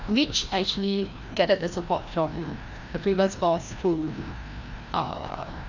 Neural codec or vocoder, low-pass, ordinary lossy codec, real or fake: codec, 16 kHz, 1 kbps, FreqCodec, larger model; 7.2 kHz; none; fake